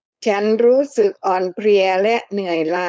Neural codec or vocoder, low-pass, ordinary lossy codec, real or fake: codec, 16 kHz, 4.8 kbps, FACodec; none; none; fake